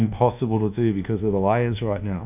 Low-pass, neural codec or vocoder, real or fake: 3.6 kHz; codec, 24 kHz, 1.2 kbps, DualCodec; fake